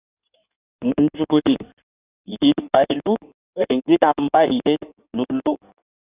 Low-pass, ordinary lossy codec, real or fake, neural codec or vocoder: 3.6 kHz; Opus, 64 kbps; fake; codec, 16 kHz in and 24 kHz out, 1.1 kbps, FireRedTTS-2 codec